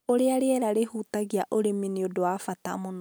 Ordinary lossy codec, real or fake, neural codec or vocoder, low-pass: none; real; none; none